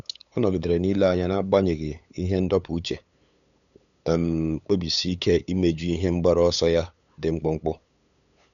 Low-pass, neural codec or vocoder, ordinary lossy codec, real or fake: 7.2 kHz; codec, 16 kHz, 8 kbps, FunCodec, trained on LibriTTS, 25 frames a second; none; fake